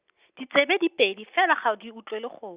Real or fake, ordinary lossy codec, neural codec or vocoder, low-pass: real; Opus, 64 kbps; none; 3.6 kHz